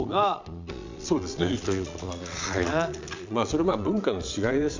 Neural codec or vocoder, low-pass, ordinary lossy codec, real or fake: vocoder, 22.05 kHz, 80 mel bands, Vocos; 7.2 kHz; MP3, 64 kbps; fake